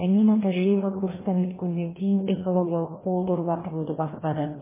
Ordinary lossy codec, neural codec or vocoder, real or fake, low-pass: MP3, 16 kbps; codec, 16 kHz, 1 kbps, FreqCodec, larger model; fake; 3.6 kHz